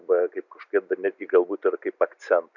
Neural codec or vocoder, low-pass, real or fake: none; 7.2 kHz; real